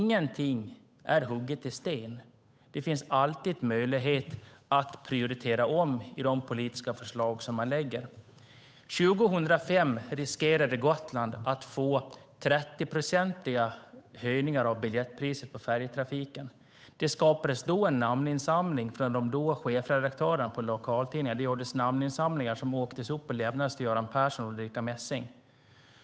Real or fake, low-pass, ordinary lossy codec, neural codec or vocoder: fake; none; none; codec, 16 kHz, 8 kbps, FunCodec, trained on Chinese and English, 25 frames a second